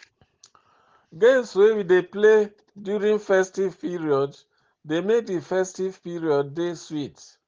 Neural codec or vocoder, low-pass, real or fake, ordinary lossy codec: none; 7.2 kHz; real; Opus, 16 kbps